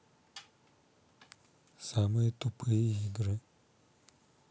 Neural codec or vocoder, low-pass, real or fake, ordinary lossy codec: none; none; real; none